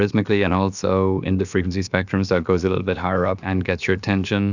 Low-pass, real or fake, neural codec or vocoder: 7.2 kHz; fake; codec, 16 kHz, about 1 kbps, DyCAST, with the encoder's durations